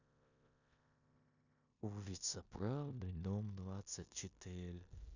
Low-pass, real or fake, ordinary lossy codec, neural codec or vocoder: 7.2 kHz; fake; none; codec, 16 kHz in and 24 kHz out, 0.9 kbps, LongCat-Audio-Codec, four codebook decoder